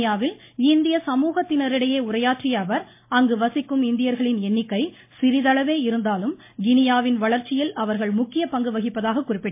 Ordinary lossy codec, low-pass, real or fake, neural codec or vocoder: MP3, 24 kbps; 3.6 kHz; real; none